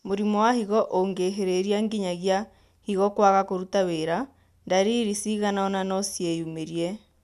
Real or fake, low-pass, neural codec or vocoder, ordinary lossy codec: real; 14.4 kHz; none; none